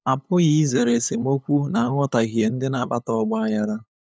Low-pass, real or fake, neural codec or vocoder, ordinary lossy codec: none; fake; codec, 16 kHz, 8 kbps, FunCodec, trained on LibriTTS, 25 frames a second; none